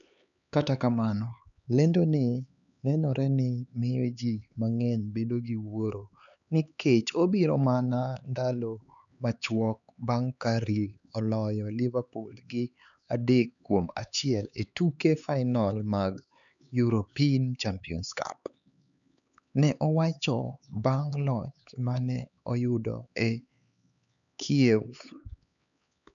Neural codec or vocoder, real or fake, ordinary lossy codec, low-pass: codec, 16 kHz, 4 kbps, X-Codec, HuBERT features, trained on LibriSpeech; fake; none; 7.2 kHz